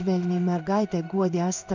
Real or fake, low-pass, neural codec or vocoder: fake; 7.2 kHz; codec, 16 kHz in and 24 kHz out, 1 kbps, XY-Tokenizer